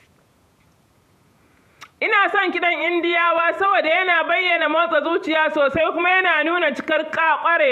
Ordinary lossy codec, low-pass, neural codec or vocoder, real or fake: none; 14.4 kHz; vocoder, 48 kHz, 128 mel bands, Vocos; fake